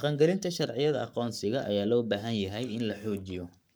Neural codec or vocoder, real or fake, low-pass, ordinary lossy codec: codec, 44.1 kHz, 7.8 kbps, Pupu-Codec; fake; none; none